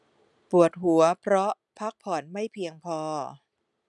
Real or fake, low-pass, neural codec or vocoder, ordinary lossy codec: real; 10.8 kHz; none; none